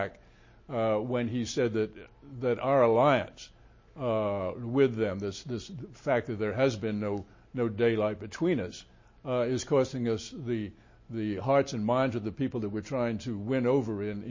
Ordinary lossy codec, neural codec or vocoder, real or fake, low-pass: MP3, 32 kbps; none; real; 7.2 kHz